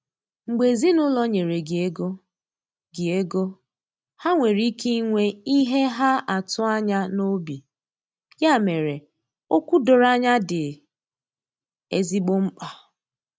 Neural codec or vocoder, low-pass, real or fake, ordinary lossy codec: none; none; real; none